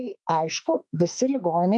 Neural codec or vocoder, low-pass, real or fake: autoencoder, 48 kHz, 32 numbers a frame, DAC-VAE, trained on Japanese speech; 10.8 kHz; fake